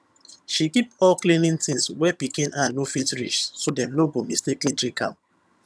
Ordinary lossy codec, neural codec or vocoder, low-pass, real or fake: none; vocoder, 22.05 kHz, 80 mel bands, HiFi-GAN; none; fake